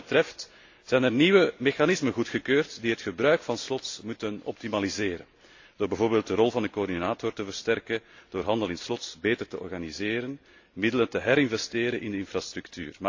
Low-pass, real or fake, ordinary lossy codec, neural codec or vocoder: 7.2 kHz; real; AAC, 48 kbps; none